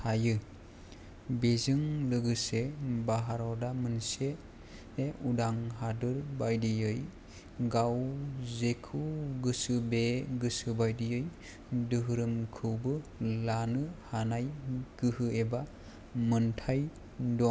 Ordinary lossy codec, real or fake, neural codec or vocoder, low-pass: none; real; none; none